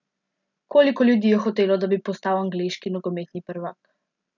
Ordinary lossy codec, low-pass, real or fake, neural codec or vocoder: none; 7.2 kHz; real; none